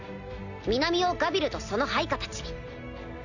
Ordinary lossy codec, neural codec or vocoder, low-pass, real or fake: none; none; 7.2 kHz; real